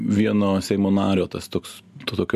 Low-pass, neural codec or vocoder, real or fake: 14.4 kHz; none; real